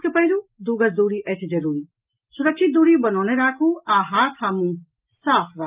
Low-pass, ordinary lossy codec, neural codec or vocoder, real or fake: 3.6 kHz; Opus, 24 kbps; none; real